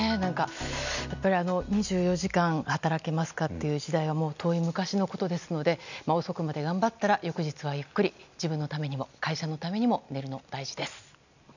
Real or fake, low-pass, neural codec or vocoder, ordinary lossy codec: real; 7.2 kHz; none; none